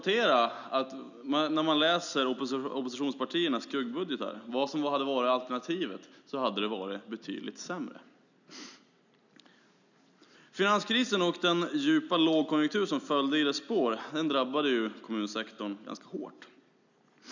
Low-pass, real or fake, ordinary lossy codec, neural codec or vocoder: 7.2 kHz; real; none; none